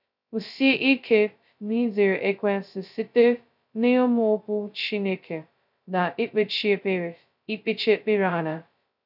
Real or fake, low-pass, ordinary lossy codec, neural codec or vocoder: fake; 5.4 kHz; none; codec, 16 kHz, 0.2 kbps, FocalCodec